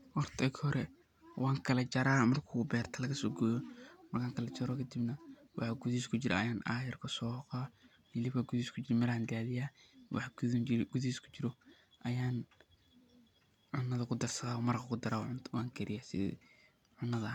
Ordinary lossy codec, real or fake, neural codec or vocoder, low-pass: none; real; none; 19.8 kHz